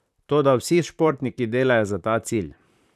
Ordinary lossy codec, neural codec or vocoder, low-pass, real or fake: none; vocoder, 44.1 kHz, 128 mel bands, Pupu-Vocoder; 14.4 kHz; fake